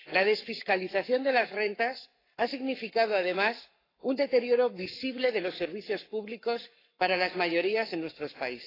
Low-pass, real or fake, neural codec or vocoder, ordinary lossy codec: 5.4 kHz; fake; codec, 44.1 kHz, 7.8 kbps, Pupu-Codec; AAC, 24 kbps